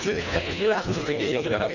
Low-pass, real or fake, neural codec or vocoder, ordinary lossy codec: 7.2 kHz; fake; codec, 24 kHz, 1.5 kbps, HILCodec; none